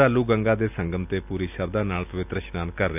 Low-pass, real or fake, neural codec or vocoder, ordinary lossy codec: 3.6 kHz; real; none; none